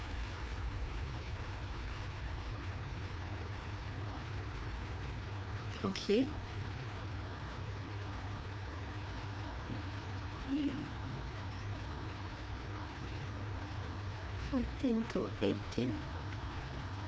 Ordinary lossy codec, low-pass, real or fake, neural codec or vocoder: none; none; fake; codec, 16 kHz, 2 kbps, FreqCodec, larger model